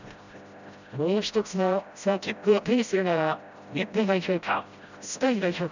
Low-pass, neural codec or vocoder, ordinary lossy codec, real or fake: 7.2 kHz; codec, 16 kHz, 0.5 kbps, FreqCodec, smaller model; none; fake